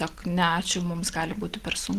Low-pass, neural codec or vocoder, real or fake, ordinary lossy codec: 14.4 kHz; none; real; Opus, 16 kbps